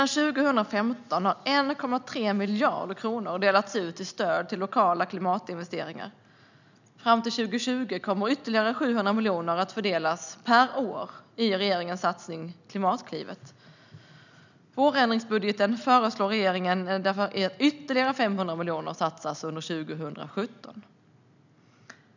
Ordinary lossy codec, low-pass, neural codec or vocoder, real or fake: none; 7.2 kHz; none; real